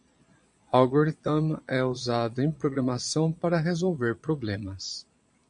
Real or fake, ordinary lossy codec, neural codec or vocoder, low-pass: fake; MP3, 64 kbps; vocoder, 22.05 kHz, 80 mel bands, Vocos; 9.9 kHz